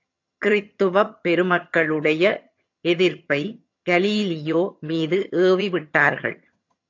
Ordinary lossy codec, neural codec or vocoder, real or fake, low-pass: AAC, 48 kbps; vocoder, 22.05 kHz, 80 mel bands, HiFi-GAN; fake; 7.2 kHz